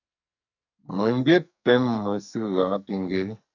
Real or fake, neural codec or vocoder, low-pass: fake; codec, 44.1 kHz, 2.6 kbps, SNAC; 7.2 kHz